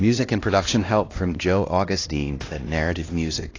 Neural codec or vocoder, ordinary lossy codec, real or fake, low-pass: codec, 16 kHz, 1 kbps, X-Codec, HuBERT features, trained on LibriSpeech; AAC, 32 kbps; fake; 7.2 kHz